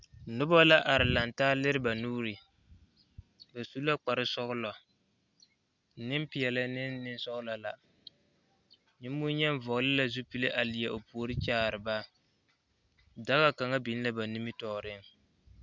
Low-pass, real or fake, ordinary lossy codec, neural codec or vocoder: 7.2 kHz; real; Opus, 64 kbps; none